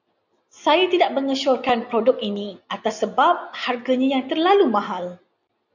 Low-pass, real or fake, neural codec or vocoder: 7.2 kHz; real; none